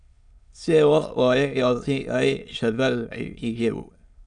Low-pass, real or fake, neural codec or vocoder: 9.9 kHz; fake; autoencoder, 22.05 kHz, a latent of 192 numbers a frame, VITS, trained on many speakers